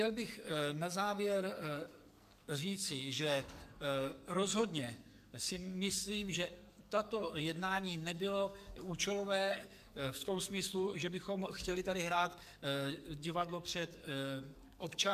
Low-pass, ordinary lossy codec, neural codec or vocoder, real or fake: 14.4 kHz; MP3, 96 kbps; codec, 44.1 kHz, 2.6 kbps, SNAC; fake